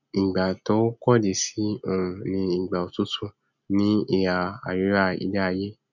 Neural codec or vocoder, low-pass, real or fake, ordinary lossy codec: none; 7.2 kHz; real; none